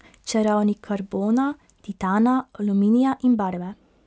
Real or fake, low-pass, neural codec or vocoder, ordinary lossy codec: real; none; none; none